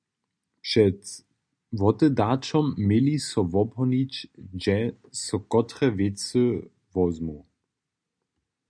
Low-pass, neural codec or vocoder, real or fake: 9.9 kHz; none; real